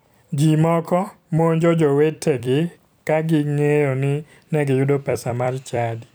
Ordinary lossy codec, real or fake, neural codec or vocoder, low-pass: none; fake; vocoder, 44.1 kHz, 128 mel bands every 256 samples, BigVGAN v2; none